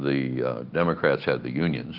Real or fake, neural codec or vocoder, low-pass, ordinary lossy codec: real; none; 5.4 kHz; Opus, 32 kbps